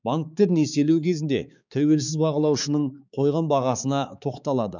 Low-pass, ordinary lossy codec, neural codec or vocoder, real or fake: 7.2 kHz; none; codec, 16 kHz, 4 kbps, X-Codec, HuBERT features, trained on balanced general audio; fake